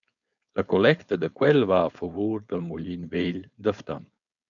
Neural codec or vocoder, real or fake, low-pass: codec, 16 kHz, 4.8 kbps, FACodec; fake; 7.2 kHz